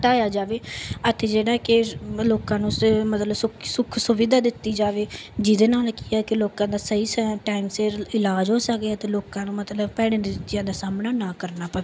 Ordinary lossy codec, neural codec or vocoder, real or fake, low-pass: none; none; real; none